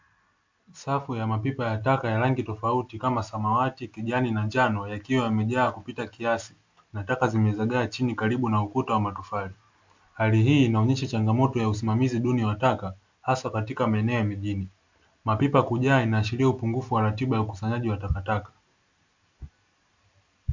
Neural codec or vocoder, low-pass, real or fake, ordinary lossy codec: none; 7.2 kHz; real; MP3, 64 kbps